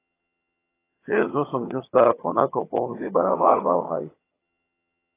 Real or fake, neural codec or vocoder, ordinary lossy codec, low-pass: fake; vocoder, 22.05 kHz, 80 mel bands, HiFi-GAN; AAC, 16 kbps; 3.6 kHz